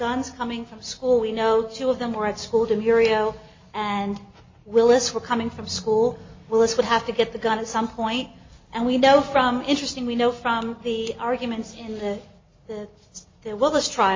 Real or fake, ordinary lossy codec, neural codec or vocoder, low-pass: real; MP3, 32 kbps; none; 7.2 kHz